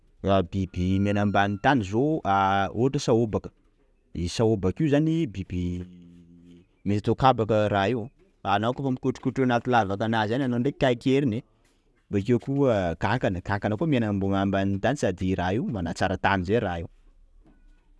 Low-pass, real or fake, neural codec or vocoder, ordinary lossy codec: none; real; none; none